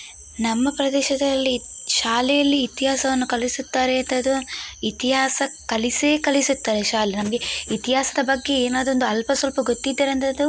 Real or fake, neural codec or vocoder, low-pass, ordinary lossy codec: real; none; none; none